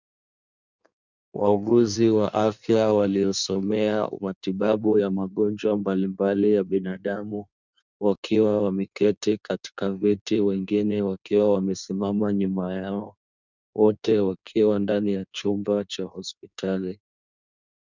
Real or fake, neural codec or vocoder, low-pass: fake; codec, 16 kHz in and 24 kHz out, 1.1 kbps, FireRedTTS-2 codec; 7.2 kHz